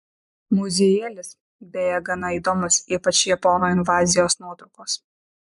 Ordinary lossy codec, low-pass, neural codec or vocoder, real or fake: MP3, 96 kbps; 10.8 kHz; vocoder, 24 kHz, 100 mel bands, Vocos; fake